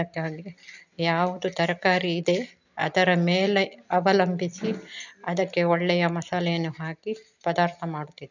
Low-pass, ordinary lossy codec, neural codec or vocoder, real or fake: 7.2 kHz; none; none; real